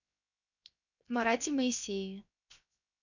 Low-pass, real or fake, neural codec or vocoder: 7.2 kHz; fake; codec, 16 kHz, 0.7 kbps, FocalCodec